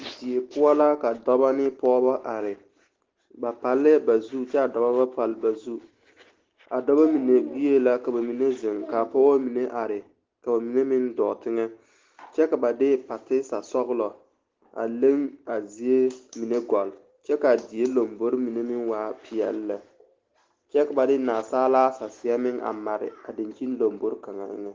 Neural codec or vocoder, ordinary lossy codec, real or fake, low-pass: none; Opus, 16 kbps; real; 7.2 kHz